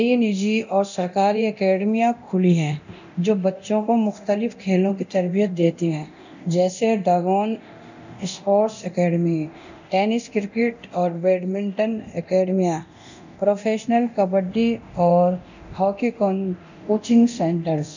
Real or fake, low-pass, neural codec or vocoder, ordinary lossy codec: fake; 7.2 kHz; codec, 24 kHz, 0.9 kbps, DualCodec; none